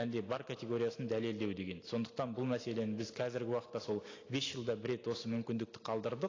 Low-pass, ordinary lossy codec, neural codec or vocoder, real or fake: 7.2 kHz; AAC, 32 kbps; none; real